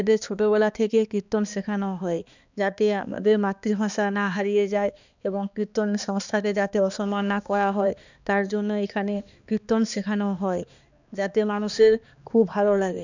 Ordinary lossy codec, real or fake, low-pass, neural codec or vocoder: none; fake; 7.2 kHz; codec, 16 kHz, 2 kbps, X-Codec, HuBERT features, trained on balanced general audio